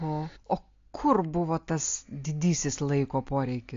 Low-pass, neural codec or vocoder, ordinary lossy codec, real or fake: 7.2 kHz; none; AAC, 48 kbps; real